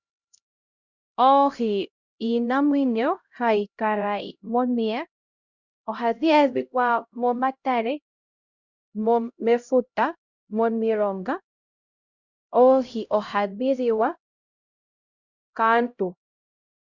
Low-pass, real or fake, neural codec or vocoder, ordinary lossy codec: 7.2 kHz; fake; codec, 16 kHz, 0.5 kbps, X-Codec, HuBERT features, trained on LibriSpeech; Opus, 64 kbps